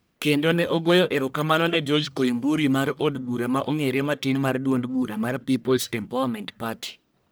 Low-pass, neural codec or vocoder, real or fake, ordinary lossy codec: none; codec, 44.1 kHz, 1.7 kbps, Pupu-Codec; fake; none